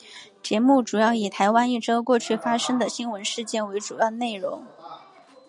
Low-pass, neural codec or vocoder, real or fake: 10.8 kHz; none; real